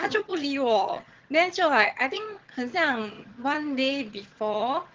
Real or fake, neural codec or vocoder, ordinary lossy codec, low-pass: fake; vocoder, 22.05 kHz, 80 mel bands, HiFi-GAN; Opus, 16 kbps; 7.2 kHz